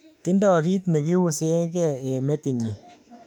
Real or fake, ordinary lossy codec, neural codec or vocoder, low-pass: fake; none; autoencoder, 48 kHz, 32 numbers a frame, DAC-VAE, trained on Japanese speech; 19.8 kHz